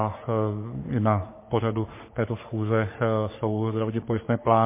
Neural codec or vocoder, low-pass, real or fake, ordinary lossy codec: codec, 44.1 kHz, 3.4 kbps, Pupu-Codec; 3.6 kHz; fake; MP3, 24 kbps